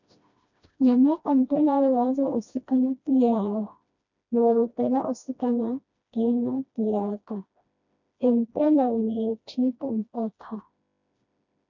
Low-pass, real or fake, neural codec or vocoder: 7.2 kHz; fake; codec, 16 kHz, 1 kbps, FreqCodec, smaller model